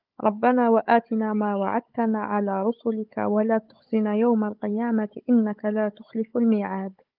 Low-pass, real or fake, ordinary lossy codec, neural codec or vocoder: 5.4 kHz; fake; Opus, 24 kbps; codec, 16 kHz, 16 kbps, FunCodec, trained on Chinese and English, 50 frames a second